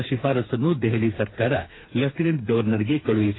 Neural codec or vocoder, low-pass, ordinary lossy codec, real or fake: codec, 16 kHz, 4 kbps, FreqCodec, smaller model; 7.2 kHz; AAC, 16 kbps; fake